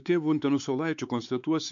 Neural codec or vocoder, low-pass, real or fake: codec, 16 kHz, 4 kbps, X-Codec, WavLM features, trained on Multilingual LibriSpeech; 7.2 kHz; fake